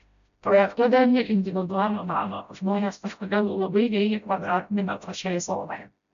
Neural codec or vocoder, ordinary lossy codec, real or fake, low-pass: codec, 16 kHz, 0.5 kbps, FreqCodec, smaller model; AAC, 96 kbps; fake; 7.2 kHz